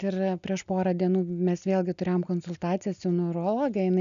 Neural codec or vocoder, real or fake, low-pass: none; real; 7.2 kHz